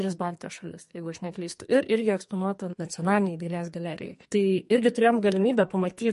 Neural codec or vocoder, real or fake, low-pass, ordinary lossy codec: codec, 44.1 kHz, 2.6 kbps, SNAC; fake; 14.4 kHz; MP3, 48 kbps